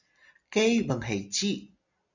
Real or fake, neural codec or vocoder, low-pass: real; none; 7.2 kHz